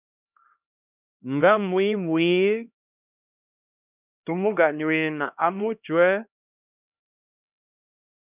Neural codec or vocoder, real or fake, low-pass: codec, 16 kHz, 1 kbps, X-Codec, HuBERT features, trained on LibriSpeech; fake; 3.6 kHz